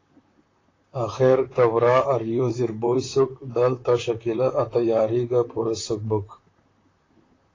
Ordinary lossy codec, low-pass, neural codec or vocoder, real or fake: AAC, 32 kbps; 7.2 kHz; vocoder, 44.1 kHz, 128 mel bands, Pupu-Vocoder; fake